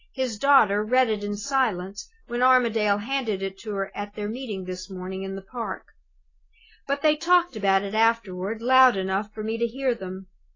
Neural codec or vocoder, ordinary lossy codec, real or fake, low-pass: none; AAC, 32 kbps; real; 7.2 kHz